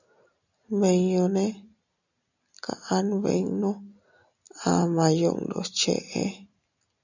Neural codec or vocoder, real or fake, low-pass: none; real; 7.2 kHz